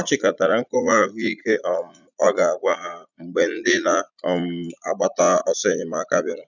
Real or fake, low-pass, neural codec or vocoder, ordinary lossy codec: fake; 7.2 kHz; vocoder, 44.1 kHz, 80 mel bands, Vocos; none